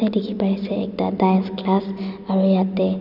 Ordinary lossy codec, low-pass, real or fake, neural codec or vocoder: none; 5.4 kHz; real; none